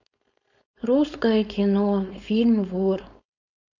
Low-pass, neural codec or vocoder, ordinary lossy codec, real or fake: 7.2 kHz; codec, 16 kHz, 4.8 kbps, FACodec; none; fake